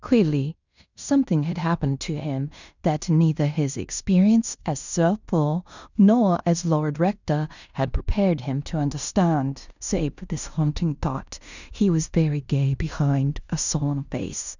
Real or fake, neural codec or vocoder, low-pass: fake; codec, 16 kHz in and 24 kHz out, 0.9 kbps, LongCat-Audio-Codec, fine tuned four codebook decoder; 7.2 kHz